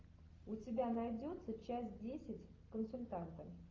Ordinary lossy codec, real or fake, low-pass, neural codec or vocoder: Opus, 32 kbps; real; 7.2 kHz; none